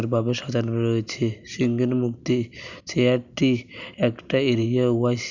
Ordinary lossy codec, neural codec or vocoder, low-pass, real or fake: none; none; 7.2 kHz; real